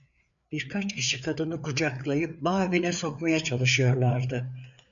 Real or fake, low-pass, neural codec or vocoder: fake; 7.2 kHz; codec, 16 kHz, 4 kbps, FreqCodec, larger model